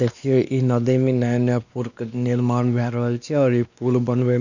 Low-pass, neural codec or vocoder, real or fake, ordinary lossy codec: 7.2 kHz; codec, 16 kHz, 2 kbps, X-Codec, WavLM features, trained on Multilingual LibriSpeech; fake; none